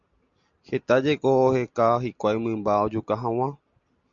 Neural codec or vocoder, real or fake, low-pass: none; real; 7.2 kHz